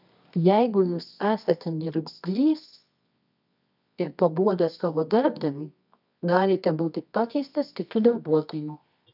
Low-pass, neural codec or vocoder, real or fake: 5.4 kHz; codec, 24 kHz, 0.9 kbps, WavTokenizer, medium music audio release; fake